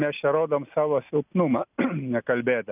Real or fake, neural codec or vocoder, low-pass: real; none; 3.6 kHz